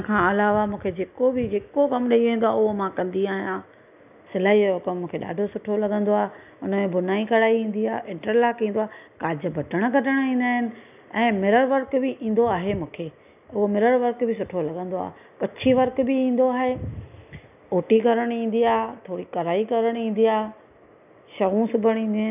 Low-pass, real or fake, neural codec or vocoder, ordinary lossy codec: 3.6 kHz; real; none; none